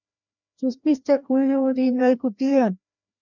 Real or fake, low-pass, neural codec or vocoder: fake; 7.2 kHz; codec, 16 kHz, 1 kbps, FreqCodec, larger model